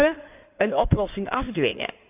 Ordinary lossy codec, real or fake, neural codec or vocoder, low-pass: none; fake; codec, 16 kHz in and 24 kHz out, 2.2 kbps, FireRedTTS-2 codec; 3.6 kHz